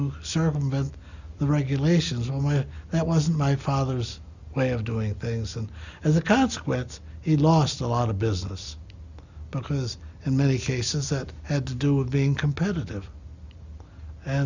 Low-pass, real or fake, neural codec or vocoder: 7.2 kHz; real; none